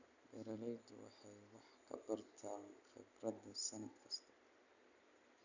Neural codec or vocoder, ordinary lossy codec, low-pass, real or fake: none; none; 7.2 kHz; real